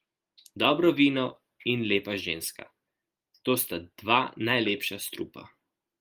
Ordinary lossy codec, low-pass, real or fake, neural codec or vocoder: Opus, 32 kbps; 14.4 kHz; real; none